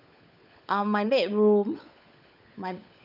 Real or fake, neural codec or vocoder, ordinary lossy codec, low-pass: fake; codec, 16 kHz, 16 kbps, FunCodec, trained on LibriTTS, 50 frames a second; none; 5.4 kHz